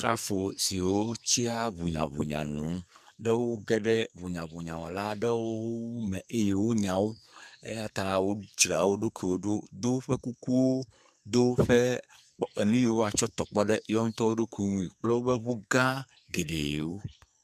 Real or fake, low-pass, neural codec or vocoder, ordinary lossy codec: fake; 14.4 kHz; codec, 44.1 kHz, 2.6 kbps, SNAC; MP3, 96 kbps